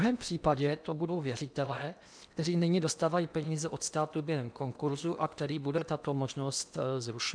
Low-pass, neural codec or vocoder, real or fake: 9.9 kHz; codec, 16 kHz in and 24 kHz out, 0.8 kbps, FocalCodec, streaming, 65536 codes; fake